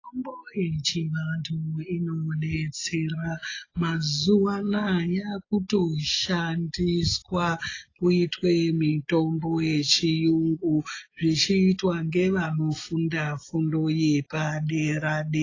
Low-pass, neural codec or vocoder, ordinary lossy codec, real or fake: 7.2 kHz; none; AAC, 32 kbps; real